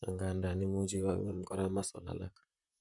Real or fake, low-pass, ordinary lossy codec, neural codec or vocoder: fake; 10.8 kHz; none; vocoder, 44.1 kHz, 128 mel bands, Pupu-Vocoder